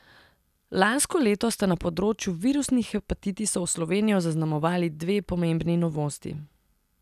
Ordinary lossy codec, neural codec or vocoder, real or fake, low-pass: none; none; real; 14.4 kHz